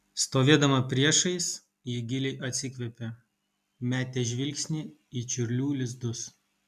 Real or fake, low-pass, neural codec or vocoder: real; 14.4 kHz; none